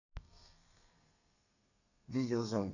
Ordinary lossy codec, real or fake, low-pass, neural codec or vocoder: none; fake; 7.2 kHz; codec, 32 kHz, 1.9 kbps, SNAC